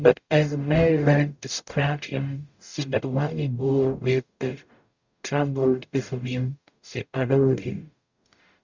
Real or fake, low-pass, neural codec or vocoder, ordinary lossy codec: fake; 7.2 kHz; codec, 44.1 kHz, 0.9 kbps, DAC; Opus, 64 kbps